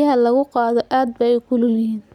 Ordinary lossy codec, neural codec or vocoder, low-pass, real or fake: none; none; 19.8 kHz; real